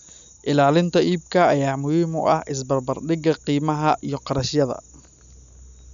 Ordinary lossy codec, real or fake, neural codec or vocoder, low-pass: none; real; none; 7.2 kHz